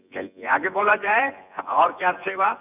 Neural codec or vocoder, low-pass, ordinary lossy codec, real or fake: vocoder, 24 kHz, 100 mel bands, Vocos; 3.6 kHz; none; fake